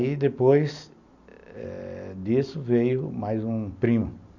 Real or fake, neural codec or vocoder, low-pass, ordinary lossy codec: real; none; 7.2 kHz; none